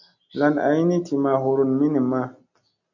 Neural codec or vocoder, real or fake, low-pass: none; real; 7.2 kHz